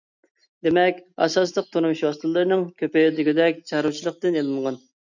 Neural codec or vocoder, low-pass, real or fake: none; 7.2 kHz; real